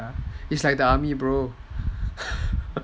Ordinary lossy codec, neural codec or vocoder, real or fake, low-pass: none; none; real; none